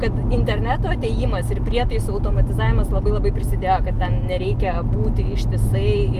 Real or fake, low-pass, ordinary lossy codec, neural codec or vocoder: real; 14.4 kHz; Opus, 32 kbps; none